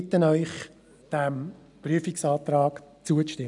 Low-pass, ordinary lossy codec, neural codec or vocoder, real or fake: 10.8 kHz; none; none; real